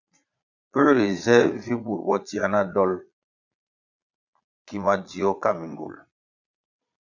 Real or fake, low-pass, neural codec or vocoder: fake; 7.2 kHz; vocoder, 22.05 kHz, 80 mel bands, Vocos